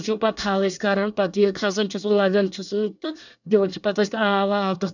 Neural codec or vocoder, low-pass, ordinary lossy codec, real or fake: codec, 24 kHz, 1 kbps, SNAC; 7.2 kHz; none; fake